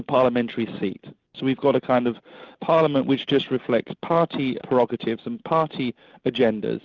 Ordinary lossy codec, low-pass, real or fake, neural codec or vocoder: Opus, 24 kbps; 7.2 kHz; real; none